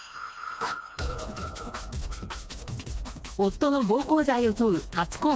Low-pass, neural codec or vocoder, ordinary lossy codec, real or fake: none; codec, 16 kHz, 2 kbps, FreqCodec, smaller model; none; fake